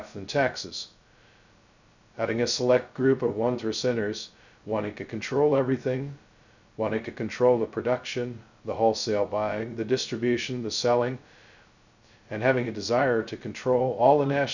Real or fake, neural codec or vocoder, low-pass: fake; codec, 16 kHz, 0.2 kbps, FocalCodec; 7.2 kHz